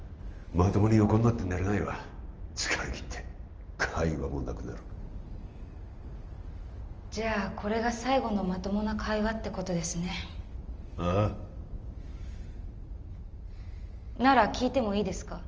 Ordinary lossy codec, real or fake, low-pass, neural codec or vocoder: Opus, 24 kbps; real; 7.2 kHz; none